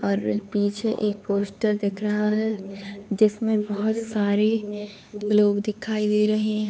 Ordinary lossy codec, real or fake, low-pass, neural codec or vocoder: none; fake; none; codec, 16 kHz, 4 kbps, X-Codec, HuBERT features, trained on LibriSpeech